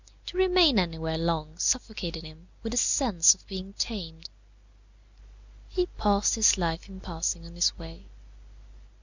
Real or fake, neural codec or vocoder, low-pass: real; none; 7.2 kHz